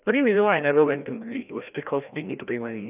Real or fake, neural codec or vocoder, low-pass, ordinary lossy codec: fake; codec, 16 kHz, 1 kbps, FreqCodec, larger model; 3.6 kHz; none